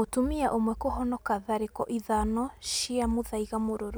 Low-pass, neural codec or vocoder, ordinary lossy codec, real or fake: none; none; none; real